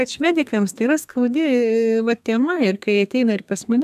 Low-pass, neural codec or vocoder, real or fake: 14.4 kHz; codec, 32 kHz, 1.9 kbps, SNAC; fake